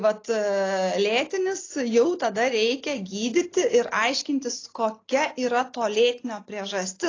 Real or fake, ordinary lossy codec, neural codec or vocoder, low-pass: real; AAC, 32 kbps; none; 7.2 kHz